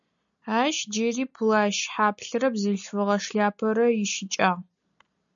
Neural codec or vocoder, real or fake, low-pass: none; real; 7.2 kHz